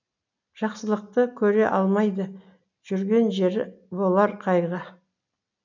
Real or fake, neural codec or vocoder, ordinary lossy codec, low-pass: real; none; none; 7.2 kHz